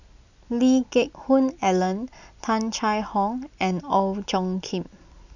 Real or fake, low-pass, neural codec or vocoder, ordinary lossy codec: real; 7.2 kHz; none; none